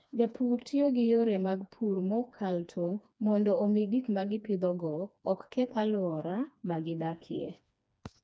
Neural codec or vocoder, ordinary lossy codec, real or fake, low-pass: codec, 16 kHz, 2 kbps, FreqCodec, smaller model; none; fake; none